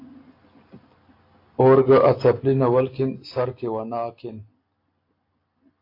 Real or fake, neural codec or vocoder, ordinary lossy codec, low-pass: real; none; AAC, 32 kbps; 5.4 kHz